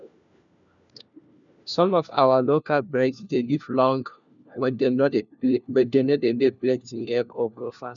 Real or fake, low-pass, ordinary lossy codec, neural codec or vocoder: fake; 7.2 kHz; none; codec, 16 kHz, 1 kbps, FunCodec, trained on LibriTTS, 50 frames a second